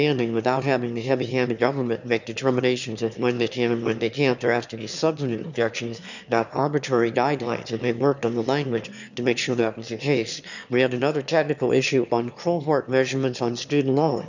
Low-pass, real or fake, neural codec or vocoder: 7.2 kHz; fake; autoencoder, 22.05 kHz, a latent of 192 numbers a frame, VITS, trained on one speaker